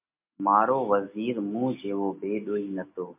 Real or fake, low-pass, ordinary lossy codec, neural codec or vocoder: real; 3.6 kHz; MP3, 32 kbps; none